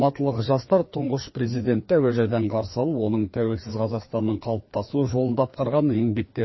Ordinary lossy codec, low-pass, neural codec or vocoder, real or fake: MP3, 24 kbps; 7.2 kHz; codec, 16 kHz, 2 kbps, FreqCodec, larger model; fake